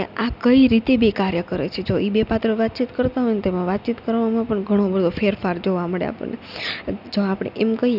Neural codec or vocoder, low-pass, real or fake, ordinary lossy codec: none; 5.4 kHz; real; none